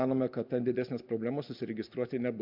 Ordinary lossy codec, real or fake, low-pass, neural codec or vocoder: MP3, 48 kbps; real; 5.4 kHz; none